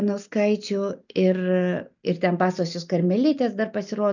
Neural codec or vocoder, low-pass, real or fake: none; 7.2 kHz; real